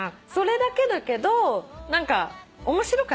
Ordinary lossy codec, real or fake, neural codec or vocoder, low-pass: none; real; none; none